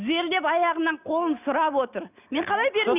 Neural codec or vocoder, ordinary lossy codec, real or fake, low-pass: none; none; real; 3.6 kHz